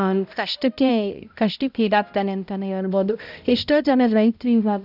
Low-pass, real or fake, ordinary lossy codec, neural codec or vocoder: 5.4 kHz; fake; none; codec, 16 kHz, 0.5 kbps, X-Codec, HuBERT features, trained on balanced general audio